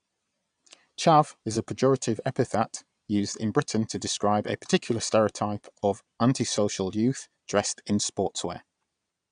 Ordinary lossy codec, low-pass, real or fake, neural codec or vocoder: none; 9.9 kHz; fake; vocoder, 22.05 kHz, 80 mel bands, Vocos